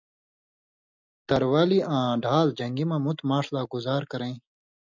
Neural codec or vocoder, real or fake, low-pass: none; real; 7.2 kHz